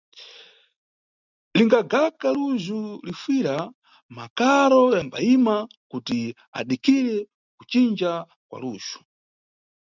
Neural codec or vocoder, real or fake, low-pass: none; real; 7.2 kHz